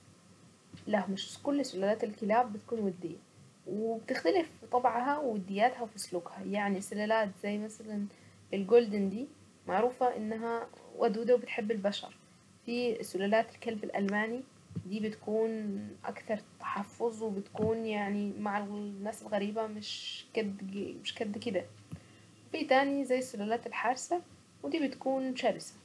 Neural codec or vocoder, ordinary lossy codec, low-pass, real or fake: none; none; none; real